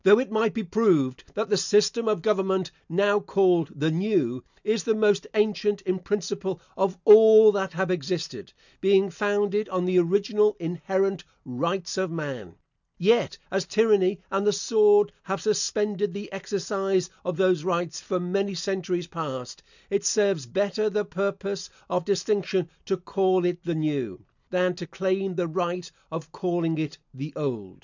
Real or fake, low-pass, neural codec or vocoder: real; 7.2 kHz; none